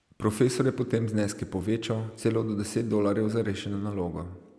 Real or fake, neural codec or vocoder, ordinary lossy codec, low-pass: real; none; none; none